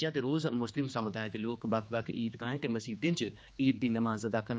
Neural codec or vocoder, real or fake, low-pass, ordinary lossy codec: codec, 16 kHz, 2 kbps, X-Codec, HuBERT features, trained on general audio; fake; none; none